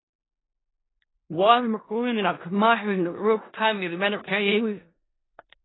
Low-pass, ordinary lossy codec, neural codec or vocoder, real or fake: 7.2 kHz; AAC, 16 kbps; codec, 16 kHz in and 24 kHz out, 0.4 kbps, LongCat-Audio-Codec, four codebook decoder; fake